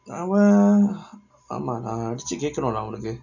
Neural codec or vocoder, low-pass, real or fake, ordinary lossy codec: none; 7.2 kHz; real; none